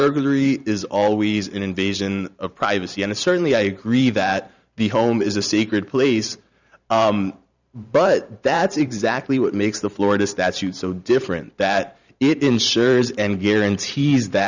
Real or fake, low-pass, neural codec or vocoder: fake; 7.2 kHz; vocoder, 44.1 kHz, 128 mel bands every 512 samples, BigVGAN v2